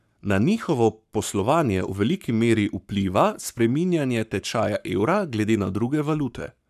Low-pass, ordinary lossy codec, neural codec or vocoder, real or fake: 14.4 kHz; none; codec, 44.1 kHz, 7.8 kbps, Pupu-Codec; fake